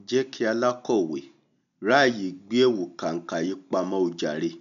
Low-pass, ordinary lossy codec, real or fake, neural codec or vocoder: 7.2 kHz; none; real; none